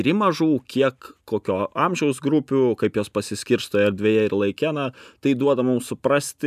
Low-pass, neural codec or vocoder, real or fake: 14.4 kHz; none; real